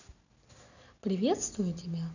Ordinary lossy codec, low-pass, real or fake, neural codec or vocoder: none; 7.2 kHz; real; none